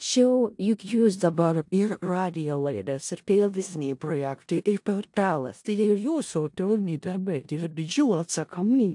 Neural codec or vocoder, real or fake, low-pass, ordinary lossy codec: codec, 16 kHz in and 24 kHz out, 0.4 kbps, LongCat-Audio-Codec, four codebook decoder; fake; 10.8 kHz; AAC, 64 kbps